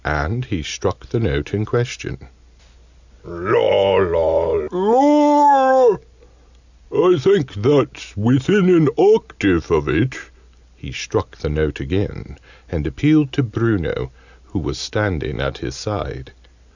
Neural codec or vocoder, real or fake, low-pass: none; real; 7.2 kHz